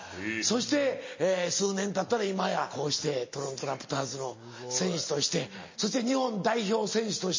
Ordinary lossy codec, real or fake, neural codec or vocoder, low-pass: MP3, 32 kbps; real; none; 7.2 kHz